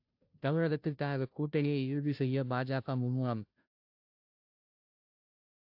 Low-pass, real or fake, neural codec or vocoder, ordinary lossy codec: 5.4 kHz; fake; codec, 16 kHz, 0.5 kbps, FunCodec, trained on Chinese and English, 25 frames a second; AAC, 48 kbps